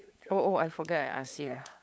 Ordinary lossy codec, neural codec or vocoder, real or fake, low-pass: none; codec, 16 kHz, 8 kbps, FunCodec, trained on LibriTTS, 25 frames a second; fake; none